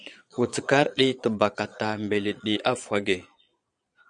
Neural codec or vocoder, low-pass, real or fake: vocoder, 22.05 kHz, 80 mel bands, Vocos; 9.9 kHz; fake